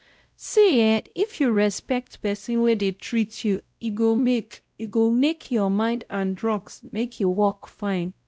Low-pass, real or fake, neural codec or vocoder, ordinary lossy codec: none; fake; codec, 16 kHz, 0.5 kbps, X-Codec, WavLM features, trained on Multilingual LibriSpeech; none